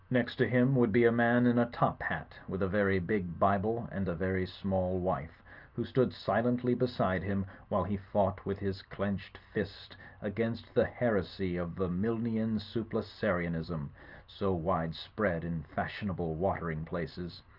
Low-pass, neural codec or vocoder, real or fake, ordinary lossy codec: 5.4 kHz; none; real; Opus, 24 kbps